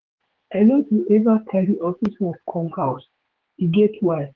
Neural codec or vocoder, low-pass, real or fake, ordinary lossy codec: codec, 16 kHz, 4 kbps, X-Codec, HuBERT features, trained on general audio; 7.2 kHz; fake; Opus, 16 kbps